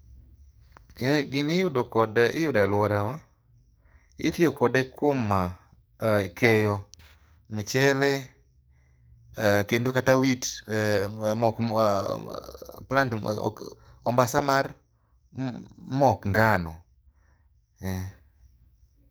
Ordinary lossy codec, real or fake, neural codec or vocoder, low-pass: none; fake; codec, 44.1 kHz, 2.6 kbps, SNAC; none